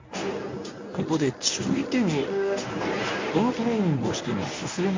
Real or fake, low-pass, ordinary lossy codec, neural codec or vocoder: fake; 7.2 kHz; MP3, 48 kbps; codec, 24 kHz, 0.9 kbps, WavTokenizer, medium speech release version 1